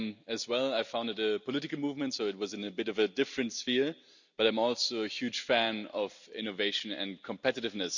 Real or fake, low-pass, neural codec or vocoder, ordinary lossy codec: real; 7.2 kHz; none; none